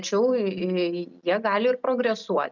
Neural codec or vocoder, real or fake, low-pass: none; real; 7.2 kHz